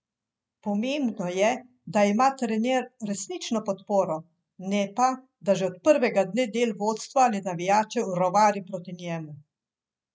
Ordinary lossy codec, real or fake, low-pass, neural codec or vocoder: none; real; none; none